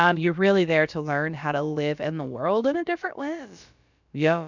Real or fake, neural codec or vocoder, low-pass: fake; codec, 16 kHz, about 1 kbps, DyCAST, with the encoder's durations; 7.2 kHz